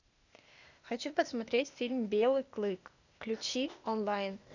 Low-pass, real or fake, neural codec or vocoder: 7.2 kHz; fake; codec, 16 kHz, 0.8 kbps, ZipCodec